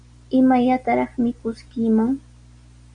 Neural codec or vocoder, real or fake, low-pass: none; real; 9.9 kHz